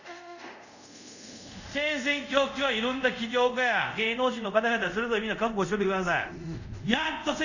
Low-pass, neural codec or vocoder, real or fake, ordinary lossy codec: 7.2 kHz; codec, 24 kHz, 0.5 kbps, DualCodec; fake; none